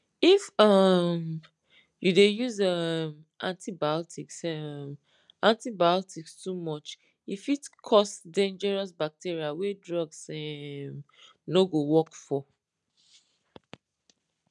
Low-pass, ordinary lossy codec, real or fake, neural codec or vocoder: 10.8 kHz; none; real; none